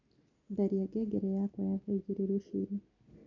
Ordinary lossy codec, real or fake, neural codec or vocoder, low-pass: none; real; none; 7.2 kHz